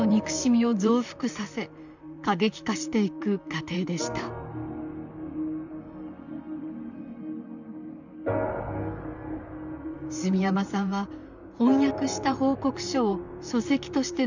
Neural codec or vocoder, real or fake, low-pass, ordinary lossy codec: vocoder, 44.1 kHz, 128 mel bands, Pupu-Vocoder; fake; 7.2 kHz; none